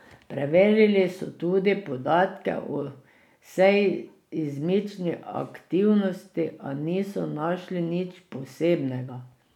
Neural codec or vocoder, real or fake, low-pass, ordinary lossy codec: none; real; 19.8 kHz; none